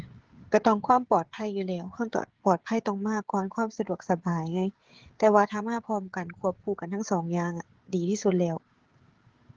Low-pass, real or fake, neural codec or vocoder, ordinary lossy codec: 7.2 kHz; fake; codec, 16 kHz, 16 kbps, FreqCodec, smaller model; Opus, 16 kbps